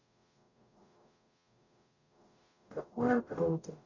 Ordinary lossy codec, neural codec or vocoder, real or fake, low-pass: none; codec, 44.1 kHz, 0.9 kbps, DAC; fake; 7.2 kHz